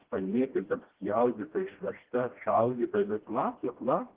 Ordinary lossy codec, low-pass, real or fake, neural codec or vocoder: Opus, 16 kbps; 3.6 kHz; fake; codec, 16 kHz, 1 kbps, FreqCodec, smaller model